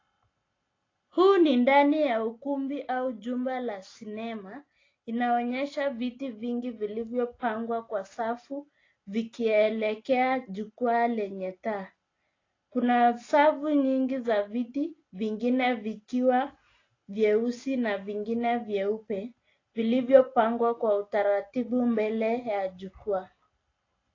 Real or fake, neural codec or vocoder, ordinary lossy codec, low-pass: real; none; AAC, 32 kbps; 7.2 kHz